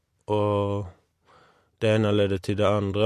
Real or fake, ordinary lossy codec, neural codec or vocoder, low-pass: real; MP3, 64 kbps; none; 14.4 kHz